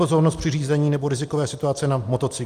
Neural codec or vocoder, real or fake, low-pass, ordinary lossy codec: none; real; 14.4 kHz; Opus, 32 kbps